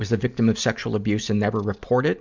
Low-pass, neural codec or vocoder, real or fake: 7.2 kHz; none; real